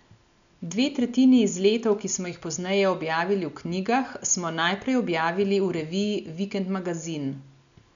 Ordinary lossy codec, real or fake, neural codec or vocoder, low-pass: none; real; none; 7.2 kHz